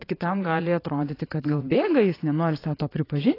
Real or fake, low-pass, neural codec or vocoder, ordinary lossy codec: fake; 5.4 kHz; vocoder, 22.05 kHz, 80 mel bands, Vocos; AAC, 24 kbps